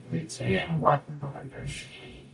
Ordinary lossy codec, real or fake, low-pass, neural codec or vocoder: AAC, 64 kbps; fake; 10.8 kHz; codec, 44.1 kHz, 0.9 kbps, DAC